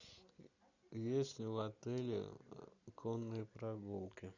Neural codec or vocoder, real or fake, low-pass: none; real; 7.2 kHz